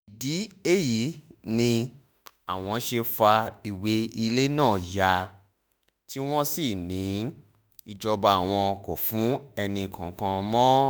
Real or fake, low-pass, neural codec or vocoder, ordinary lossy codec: fake; none; autoencoder, 48 kHz, 32 numbers a frame, DAC-VAE, trained on Japanese speech; none